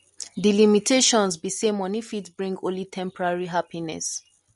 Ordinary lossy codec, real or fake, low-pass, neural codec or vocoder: MP3, 48 kbps; real; 19.8 kHz; none